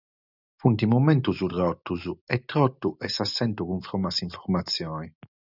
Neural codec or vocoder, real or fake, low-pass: none; real; 5.4 kHz